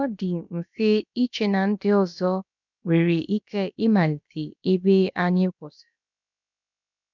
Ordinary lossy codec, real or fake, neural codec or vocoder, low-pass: none; fake; codec, 16 kHz, about 1 kbps, DyCAST, with the encoder's durations; 7.2 kHz